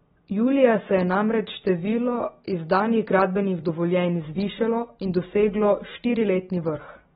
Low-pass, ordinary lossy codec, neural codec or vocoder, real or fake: 7.2 kHz; AAC, 16 kbps; none; real